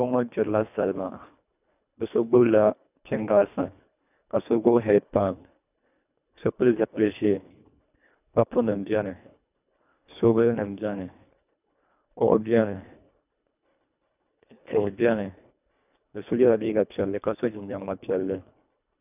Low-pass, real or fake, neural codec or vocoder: 3.6 kHz; fake; codec, 24 kHz, 1.5 kbps, HILCodec